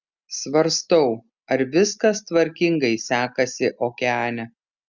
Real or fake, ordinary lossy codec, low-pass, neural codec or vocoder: real; Opus, 64 kbps; 7.2 kHz; none